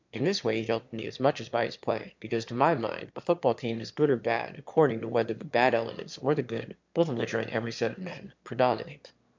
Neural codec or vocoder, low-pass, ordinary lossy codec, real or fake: autoencoder, 22.05 kHz, a latent of 192 numbers a frame, VITS, trained on one speaker; 7.2 kHz; MP3, 64 kbps; fake